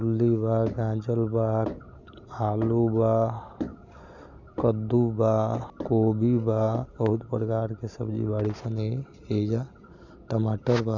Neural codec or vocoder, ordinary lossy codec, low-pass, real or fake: none; none; 7.2 kHz; real